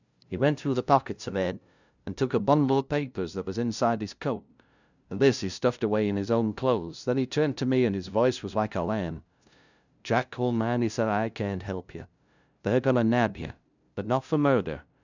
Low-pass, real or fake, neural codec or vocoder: 7.2 kHz; fake; codec, 16 kHz, 0.5 kbps, FunCodec, trained on LibriTTS, 25 frames a second